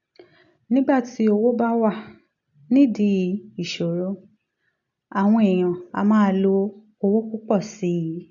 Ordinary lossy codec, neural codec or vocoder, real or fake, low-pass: none; none; real; 7.2 kHz